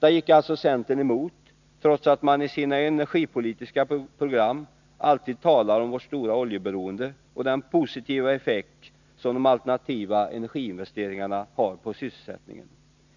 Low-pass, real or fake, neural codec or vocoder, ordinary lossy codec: 7.2 kHz; real; none; none